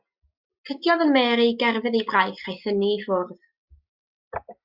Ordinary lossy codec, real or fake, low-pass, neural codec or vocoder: Opus, 64 kbps; real; 5.4 kHz; none